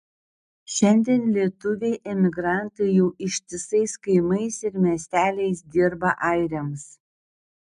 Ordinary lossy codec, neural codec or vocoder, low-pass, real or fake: MP3, 96 kbps; none; 10.8 kHz; real